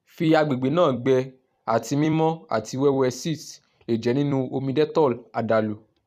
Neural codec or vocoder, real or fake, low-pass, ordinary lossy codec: vocoder, 44.1 kHz, 128 mel bands every 256 samples, BigVGAN v2; fake; 14.4 kHz; none